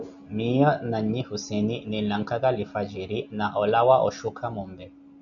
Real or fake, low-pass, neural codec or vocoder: real; 7.2 kHz; none